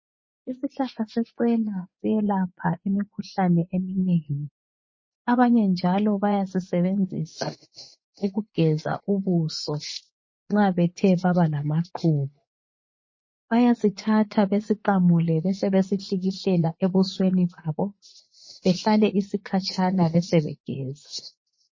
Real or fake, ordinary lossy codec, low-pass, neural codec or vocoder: fake; MP3, 32 kbps; 7.2 kHz; vocoder, 24 kHz, 100 mel bands, Vocos